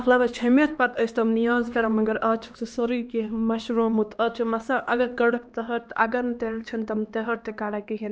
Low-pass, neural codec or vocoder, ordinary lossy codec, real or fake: none; codec, 16 kHz, 2 kbps, X-Codec, HuBERT features, trained on LibriSpeech; none; fake